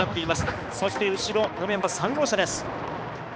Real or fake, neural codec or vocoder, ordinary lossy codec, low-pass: fake; codec, 16 kHz, 2 kbps, X-Codec, HuBERT features, trained on balanced general audio; none; none